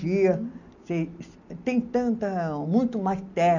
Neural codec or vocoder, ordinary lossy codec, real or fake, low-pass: none; none; real; 7.2 kHz